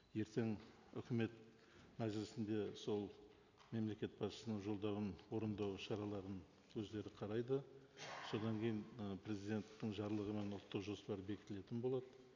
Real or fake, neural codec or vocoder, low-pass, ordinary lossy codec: real; none; 7.2 kHz; none